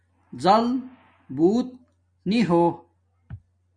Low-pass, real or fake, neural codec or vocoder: 9.9 kHz; real; none